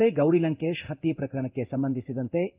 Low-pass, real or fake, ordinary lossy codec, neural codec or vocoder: 3.6 kHz; fake; Opus, 32 kbps; codec, 16 kHz in and 24 kHz out, 1 kbps, XY-Tokenizer